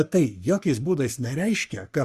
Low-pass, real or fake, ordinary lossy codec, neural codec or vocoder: 14.4 kHz; fake; Opus, 64 kbps; codec, 44.1 kHz, 3.4 kbps, Pupu-Codec